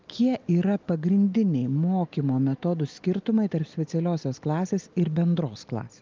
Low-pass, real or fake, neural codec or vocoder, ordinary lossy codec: 7.2 kHz; real; none; Opus, 24 kbps